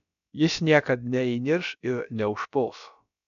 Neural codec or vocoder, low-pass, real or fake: codec, 16 kHz, about 1 kbps, DyCAST, with the encoder's durations; 7.2 kHz; fake